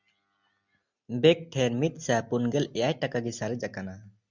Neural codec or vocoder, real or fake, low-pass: none; real; 7.2 kHz